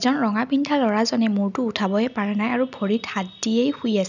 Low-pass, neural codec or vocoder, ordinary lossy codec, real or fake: 7.2 kHz; none; none; real